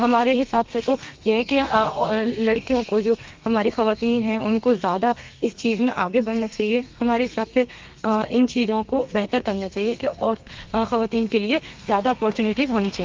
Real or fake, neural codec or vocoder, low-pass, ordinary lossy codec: fake; codec, 32 kHz, 1.9 kbps, SNAC; 7.2 kHz; Opus, 32 kbps